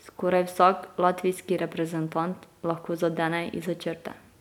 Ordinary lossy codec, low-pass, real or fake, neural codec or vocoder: none; 19.8 kHz; real; none